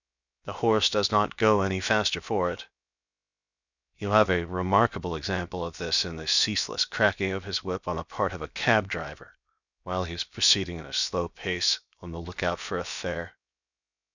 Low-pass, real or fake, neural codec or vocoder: 7.2 kHz; fake; codec, 16 kHz, 0.7 kbps, FocalCodec